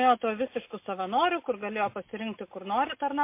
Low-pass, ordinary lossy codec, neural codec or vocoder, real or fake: 3.6 kHz; MP3, 24 kbps; none; real